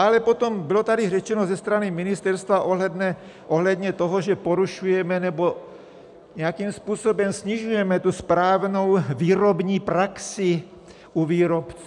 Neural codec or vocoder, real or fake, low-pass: none; real; 10.8 kHz